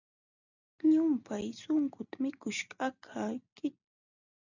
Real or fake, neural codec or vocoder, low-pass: real; none; 7.2 kHz